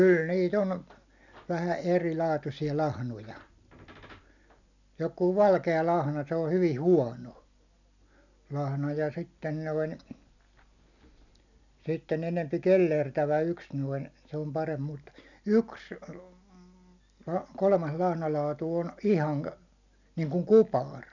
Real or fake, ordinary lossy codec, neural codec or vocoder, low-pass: real; none; none; 7.2 kHz